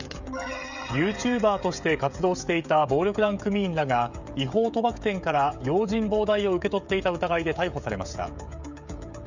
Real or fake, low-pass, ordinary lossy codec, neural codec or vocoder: fake; 7.2 kHz; none; codec, 16 kHz, 16 kbps, FreqCodec, smaller model